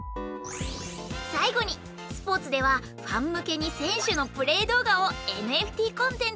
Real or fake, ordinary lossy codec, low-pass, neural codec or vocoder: real; none; none; none